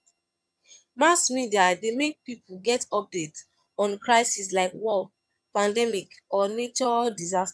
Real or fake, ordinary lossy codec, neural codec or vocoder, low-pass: fake; none; vocoder, 22.05 kHz, 80 mel bands, HiFi-GAN; none